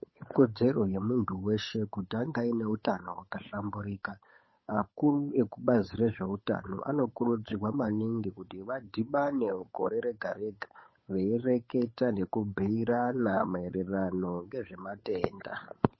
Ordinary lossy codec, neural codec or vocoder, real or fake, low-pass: MP3, 24 kbps; codec, 16 kHz, 8 kbps, FunCodec, trained on Chinese and English, 25 frames a second; fake; 7.2 kHz